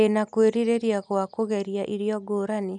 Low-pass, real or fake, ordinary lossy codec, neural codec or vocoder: 9.9 kHz; real; none; none